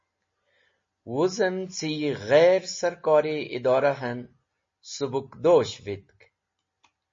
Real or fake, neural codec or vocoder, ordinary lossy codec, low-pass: real; none; MP3, 32 kbps; 7.2 kHz